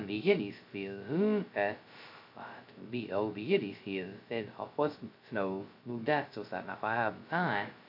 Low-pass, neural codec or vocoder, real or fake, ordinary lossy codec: 5.4 kHz; codec, 16 kHz, 0.2 kbps, FocalCodec; fake; none